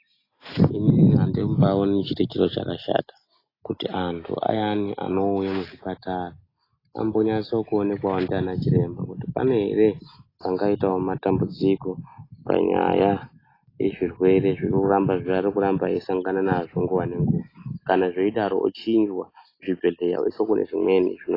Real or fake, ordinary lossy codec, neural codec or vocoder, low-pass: real; AAC, 24 kbps; none; 5.4 kHz